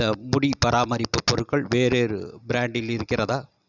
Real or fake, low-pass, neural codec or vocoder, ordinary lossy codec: real; 7.2 kHz; none; none